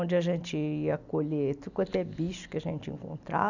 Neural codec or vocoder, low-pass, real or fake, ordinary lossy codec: none; 7.2 kHz; real; none